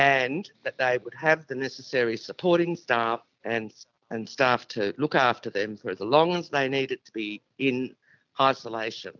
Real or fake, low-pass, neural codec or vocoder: real; 7.2 kHz; none